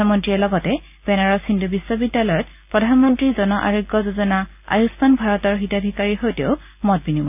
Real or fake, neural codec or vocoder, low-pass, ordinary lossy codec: real; none; 3.6 kHz; none